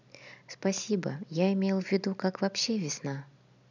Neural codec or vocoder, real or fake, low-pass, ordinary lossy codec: none; real; 7.2 kHz; none